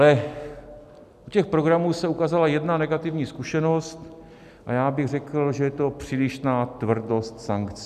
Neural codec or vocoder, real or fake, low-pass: none; real; 14.4 kHz